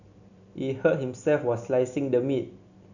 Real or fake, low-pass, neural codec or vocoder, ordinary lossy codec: real; 7.2 kHz; none; none